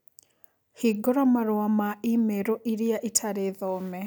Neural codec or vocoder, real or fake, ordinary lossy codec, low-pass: none; real; none; none